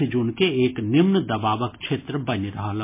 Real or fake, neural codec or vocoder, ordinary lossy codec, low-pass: real; none; MP3, 24 kbps; 3.6 kHz